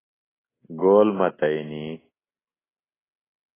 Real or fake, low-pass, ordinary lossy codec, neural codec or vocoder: real; 3.6 kHz; AAC, 16 kbps; none